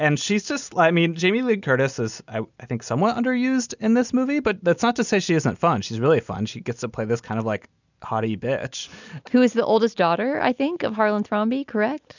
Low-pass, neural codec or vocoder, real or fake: 7.2 kHz; none; real